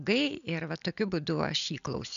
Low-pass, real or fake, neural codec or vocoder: 7.2 kHz; real; none